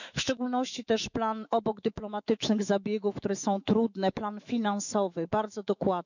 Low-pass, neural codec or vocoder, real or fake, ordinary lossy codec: 7.2 kHz; autoencoder, 48 kHz, 128 numbers a frame, DAC-VAE, trained on Japanese speech; fake; none